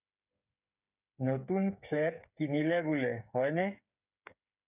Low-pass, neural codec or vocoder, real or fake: 3.6 kHz; codec, 16 kHz, 8 kbps, FreqCodec, smaller model; fake